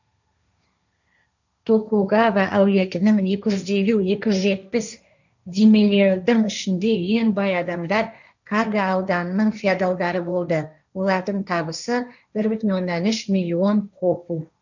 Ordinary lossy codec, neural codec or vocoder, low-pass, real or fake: none; codec, 16 kHz, 1.1 kbps, Voila-Tokenizer; 7.2 kHz; fake